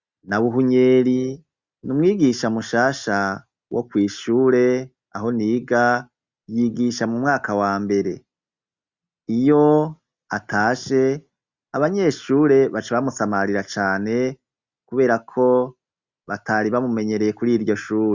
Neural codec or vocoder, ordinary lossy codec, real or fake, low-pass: none; Opus, 64 kbps; real; 7.2 kHz